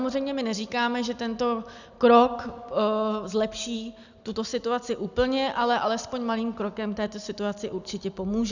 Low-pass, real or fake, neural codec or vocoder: 7.2 kHz; real; none